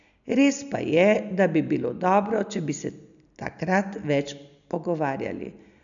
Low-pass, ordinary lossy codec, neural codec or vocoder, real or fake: 7.2 kHz; none; none; real